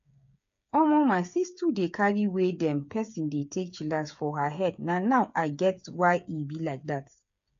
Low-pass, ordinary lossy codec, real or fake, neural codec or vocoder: 7.2 kHz; AAC, 64 kbps; fake; codec, 16 kHz, 8 kbps, FreqCodec, smaller model